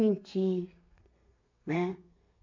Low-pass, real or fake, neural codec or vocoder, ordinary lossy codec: 7.2 kHz; fake; codec, 32 kHz, 1.9 kbps, SNAC; none